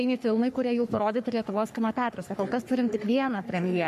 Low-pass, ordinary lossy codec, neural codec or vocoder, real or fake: 14.4 kHz; MP3, 64 kbps; codec, 44.1 kHz, 3.4 kbps, Pupu-Codec; fake